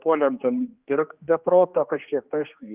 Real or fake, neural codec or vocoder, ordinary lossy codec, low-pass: fake; codec, 16 kHz, 2 kbps, X-Codec, HuBERT features, trained on general audio; Opus, 24 kbps; 3.6 kHz